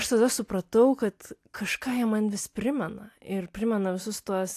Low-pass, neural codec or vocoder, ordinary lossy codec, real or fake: 14.4 kHz; none; AAC, 64 kbps; real